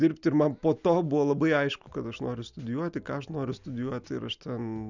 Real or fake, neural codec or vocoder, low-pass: real; none; 7.2 kHz